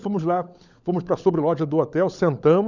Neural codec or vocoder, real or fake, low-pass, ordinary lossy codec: codec, 16 kHz, 16 kbps, FunCodec, trained on LibriTTS, 50 frames a second; fake; 7.2 kHz; none